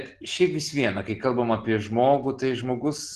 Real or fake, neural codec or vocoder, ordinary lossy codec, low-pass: real; none; Opus, 16 kbps; 10.8 kHz